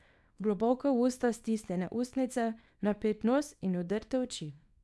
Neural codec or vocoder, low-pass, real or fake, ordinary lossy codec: codec, 24 kHz, 0.9 kbps, WavTokenizer, small release; none; fake; none